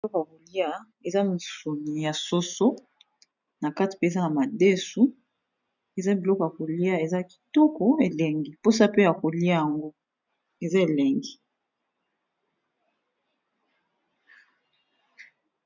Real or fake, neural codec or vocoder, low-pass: real; none; 7.2 kHz